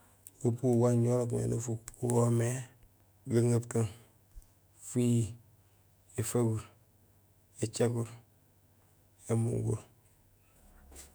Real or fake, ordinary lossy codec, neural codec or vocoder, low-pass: fake; none; autoencoder, 48 kHz, 128 numbers a frame, DAC-VAE, trained on Japanese speech; none